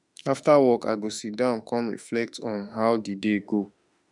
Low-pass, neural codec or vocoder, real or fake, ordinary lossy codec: 10.8 kHz; autoencoder, 48 kHz, 32 numbers a frame, DAC-VAE, trained on Japanese speech; fake; none